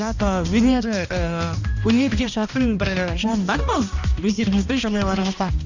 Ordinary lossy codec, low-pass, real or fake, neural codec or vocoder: none; 7.2 kHz; fake; codec, 16 kHz, 1 kbps, X-Codec, HuBERT features, trained on balanced general audio